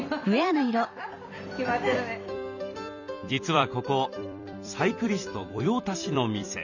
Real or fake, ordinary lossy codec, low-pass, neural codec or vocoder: fake; none; 7.2 kHz; vocoder, 44.1 kHz, 128 mel bands every 256 samples, BigVGAN v2